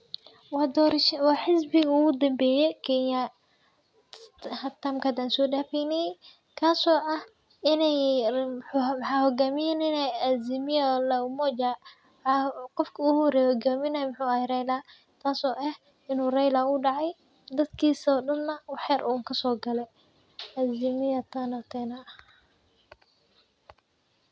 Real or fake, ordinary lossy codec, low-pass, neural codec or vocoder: real; none; none; none